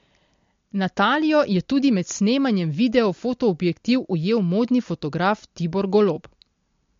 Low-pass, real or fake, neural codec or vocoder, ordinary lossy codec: 7.2 kHz; real; none; MP3, 48 kbps